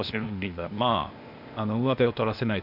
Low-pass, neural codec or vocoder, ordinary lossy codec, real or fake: 5.4 kHz; codec, 16 kHz, 0.8 kbps, ZipCodec; none; fake